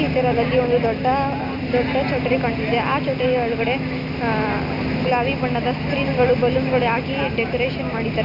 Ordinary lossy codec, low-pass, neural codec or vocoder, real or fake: none; 5.4 kHz; none; real